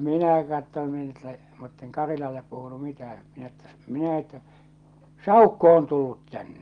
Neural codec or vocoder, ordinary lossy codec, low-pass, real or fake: none; Opus, 24 kbps; 9.9 kHz; real